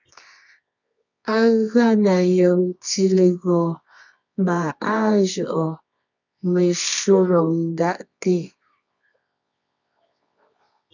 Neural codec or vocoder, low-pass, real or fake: codec, 24 kHz, 0.9 kbps, WavTokenizer, medium music audio release; 7.2 kHz; fake